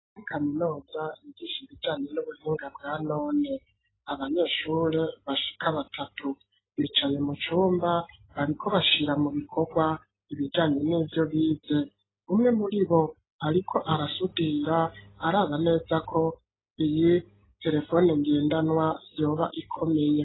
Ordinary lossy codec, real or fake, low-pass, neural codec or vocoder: AAC, 16 kbps; real; 7.2 kHz; none